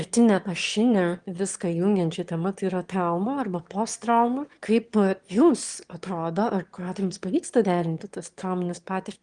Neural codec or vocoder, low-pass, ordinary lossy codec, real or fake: autoencoder, 22.05 kHz, a latent of 192 numbers a frame, VITS, trained on one speaker; 9.9 kHz; Opus, 24 kbps; fake